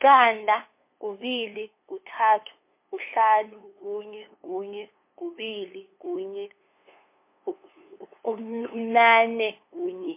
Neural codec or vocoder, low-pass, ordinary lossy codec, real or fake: codec, 16 kHz, 2 kbps, FunCodec, trained on LibriTTS, 25 frames a second; 3.6 kHz; MP3, 24 kbps; fake